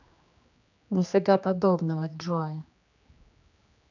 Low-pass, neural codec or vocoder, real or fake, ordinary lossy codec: 7.2 kHz; codec, 16 kHz, 2 kbps, X-Codec, HuBERT features, trained on general audio; fake; none